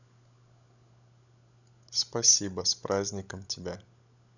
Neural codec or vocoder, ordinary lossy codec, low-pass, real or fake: codec, 16 kHz, 16 kbps, FunCodec, trained on LibriTTS, 50 frames a second; none; 7.2 kHz; fake